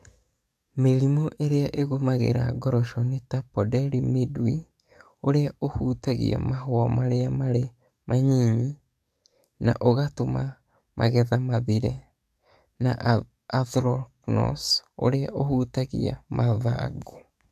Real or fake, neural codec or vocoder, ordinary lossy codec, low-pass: fake; codec, 44.1 kHz, 7.8 kbps, DAC; MP3, 96 kbps; 14.4 kHz